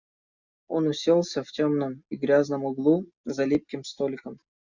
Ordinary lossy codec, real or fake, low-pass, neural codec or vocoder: Opus, 64 kbps; real; 7.2 kHz; none